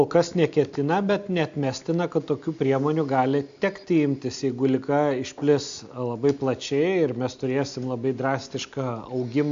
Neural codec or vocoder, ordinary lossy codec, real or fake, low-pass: none; AAC, 64 kbps; real; 7.2 kHz